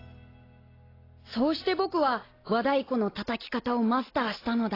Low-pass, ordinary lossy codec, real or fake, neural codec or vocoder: 5.4 kHz; AAC, 24 kbps; real; none